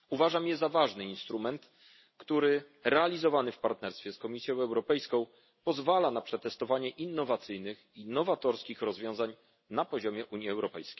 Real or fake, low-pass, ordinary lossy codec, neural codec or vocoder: real; 7.2 kHz; MP3, 24 kbps; none